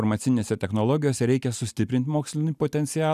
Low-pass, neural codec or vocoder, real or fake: 14.4 kHz; none; real